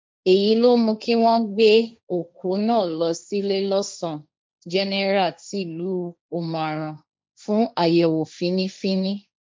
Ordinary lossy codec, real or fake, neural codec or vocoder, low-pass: none; fake; codec, 16 kHz, 1.1 kbps, Voila-Tokenizer; none